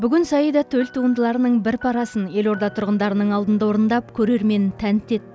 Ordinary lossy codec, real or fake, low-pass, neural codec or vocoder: none; real; none; none